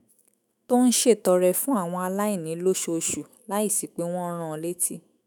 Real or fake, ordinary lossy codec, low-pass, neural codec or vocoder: fake; none; none; autoencoder, 48 kHz, 128 numbers a frame, DAC-VAE, trained on Japanese speech